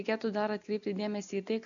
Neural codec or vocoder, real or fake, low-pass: none; real; 7.2 kHz